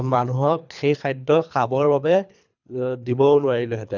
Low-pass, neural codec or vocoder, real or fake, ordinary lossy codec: 7.2 kHz; codec, 24 kHz, 3 kbps, HILCodec; fake; none